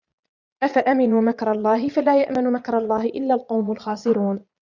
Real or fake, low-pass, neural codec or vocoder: fake; 7.2 kHz; vocoder, 22.05 kHz, 80 mel bands, Vocos